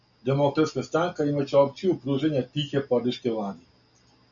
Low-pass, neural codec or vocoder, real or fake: 7.2 kHz; none; real